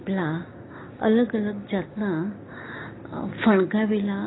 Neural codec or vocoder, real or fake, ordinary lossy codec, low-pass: codec, 16 kHz, 6 kbps, DAC; fake; AAC, 16 kbps; 7.2 kHz